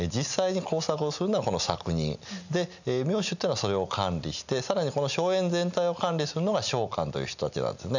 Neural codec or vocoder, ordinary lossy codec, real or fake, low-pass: none; none; real; 7.2 kHz